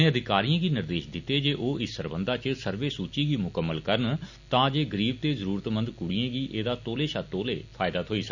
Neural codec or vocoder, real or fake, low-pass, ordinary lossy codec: none; real; 7.2 kHz; none